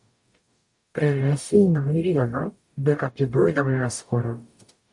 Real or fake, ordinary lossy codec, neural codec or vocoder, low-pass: fake; MP3, 48 kbps; codec, 44.1 kHz, 0.9 kbps, DAC; 10.8 kHz